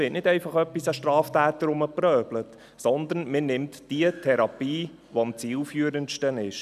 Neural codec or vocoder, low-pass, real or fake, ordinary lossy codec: none; 14.4 kHz; real; none